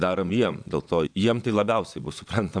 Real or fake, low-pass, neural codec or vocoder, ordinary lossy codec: fake; 9.9 kHz; vocoder, 22.05 kHz, 80 mel bands, Vocos; MP3, 96 kbps